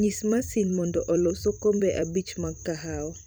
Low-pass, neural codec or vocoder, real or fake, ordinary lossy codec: none; vocoder, 44.1 kHz, 128 mel bands every 256 samples, BigVGAN v2; fake; none